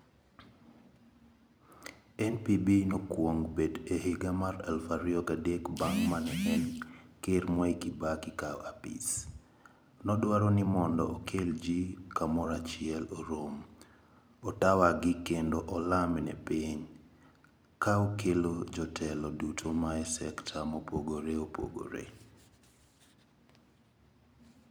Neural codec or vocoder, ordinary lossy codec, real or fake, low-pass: vocoder, 44.1 kHz, 128 mel bands every 256 samples, BigVGAN v2; none; fake; none